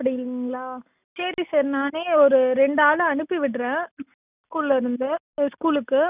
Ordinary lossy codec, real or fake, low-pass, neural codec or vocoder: none; real; 3.6 kHz; none